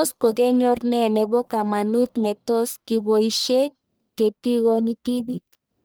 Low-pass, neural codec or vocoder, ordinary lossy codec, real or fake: none; codec, 44.1 kHz, 1.7 kbps, Pupu-Codec; none; fake